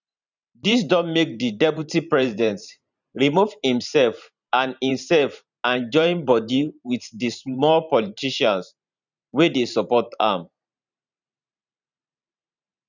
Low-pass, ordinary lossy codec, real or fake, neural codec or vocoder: 7.2 kHz; none; fake; vocoder, 44.1 kHz, 128 mel bands every 512 samples, BigVGAN v2